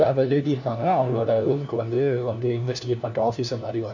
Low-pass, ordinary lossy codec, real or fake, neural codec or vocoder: 7.2 kHz; none; fake; codec, 16 kHz, 1 kbps, FunCodec, trained on LibriTTS, 50 frames a second